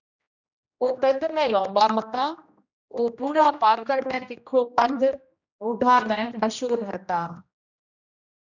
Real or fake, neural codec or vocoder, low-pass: fake; codec, 16 kHz, 1 kbps, X-Codec, HuBERT features, trained on general audio; 7.2 kHz